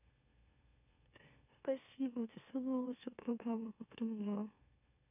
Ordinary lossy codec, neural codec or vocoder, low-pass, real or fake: none; autoencoder, 44.1 kHz, a latent of 192 numbers a frame, MeloTTS; 3.6 kHz; fake